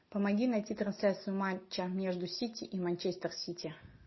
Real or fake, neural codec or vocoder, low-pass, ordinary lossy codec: real; none; 7.2 kHz; MP3, 24 kbps